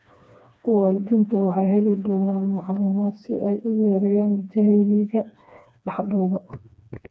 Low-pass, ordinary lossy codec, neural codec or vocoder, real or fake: none; none; codec, 16 kHz, 2 kbps, FreqCodec, smaller model; fake